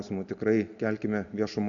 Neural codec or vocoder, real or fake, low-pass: none; real; 7.2 kHz